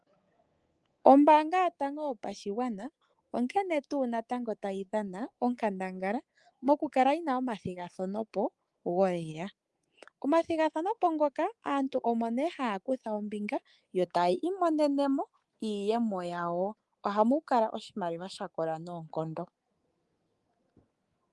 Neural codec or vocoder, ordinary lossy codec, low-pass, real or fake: codec, 24 kHz, 3.1 kbps, DualCodec; Opus, 24 kbps; 10.8 kHz; fake